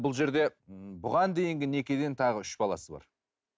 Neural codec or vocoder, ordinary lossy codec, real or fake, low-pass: none; none; real; none